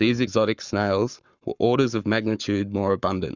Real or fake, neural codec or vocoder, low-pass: fake; codec, 44.1 kHz, 7.8 kbps, Pupu-Codec; 7.2 kHz